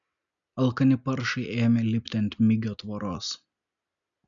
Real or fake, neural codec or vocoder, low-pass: real; none; 7.2 kHz